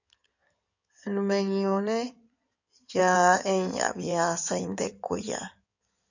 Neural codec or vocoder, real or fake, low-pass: codec, 16 kHz in and 24 kHz out, 2.2 kbps, FireRedTTS-2 codec; fake; 7.2 kHz